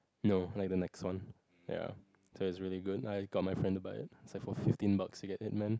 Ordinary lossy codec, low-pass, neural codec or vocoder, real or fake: none; none; none; real